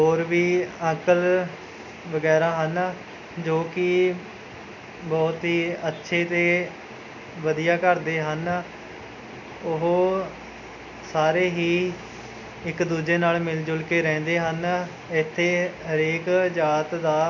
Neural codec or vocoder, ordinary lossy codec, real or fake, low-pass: none; none; real; 7.2 kHz